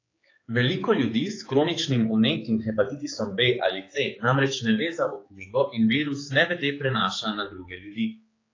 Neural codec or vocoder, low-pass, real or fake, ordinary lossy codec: codec, 16 kHz, 4 kbps, X-Codec, HuBERT features, trained on general audio; 7.2 kHz; fake; AAC, 32 kbps